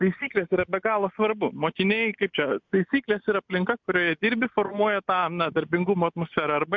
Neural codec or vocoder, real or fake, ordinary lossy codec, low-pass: none; real; MP3, 64 kbps; 7.2 kHz